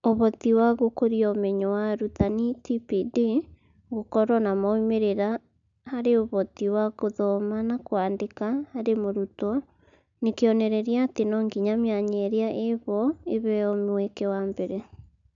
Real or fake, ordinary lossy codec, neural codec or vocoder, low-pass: real; none; none; 7.2 kHz